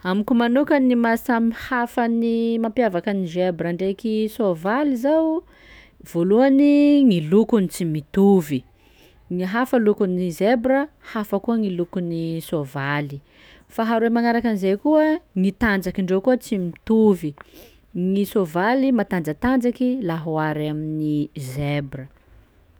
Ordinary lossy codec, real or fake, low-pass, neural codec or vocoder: none; fake; none; autoencoder, 48 kHz, 128 numbers a frame, DAC-VAE, trained on Japanese speech